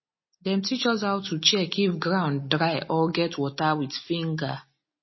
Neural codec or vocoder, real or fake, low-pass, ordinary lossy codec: none; real; 7.2 kHz; MP3, 24 kbps